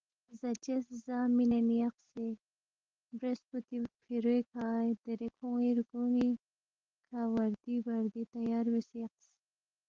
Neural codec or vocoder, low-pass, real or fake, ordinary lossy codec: none; 7.2 kHz; real; Opus, 16 kbps